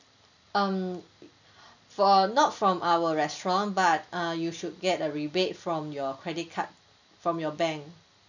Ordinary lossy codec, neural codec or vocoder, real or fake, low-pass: none; none; real; 7.2 kHz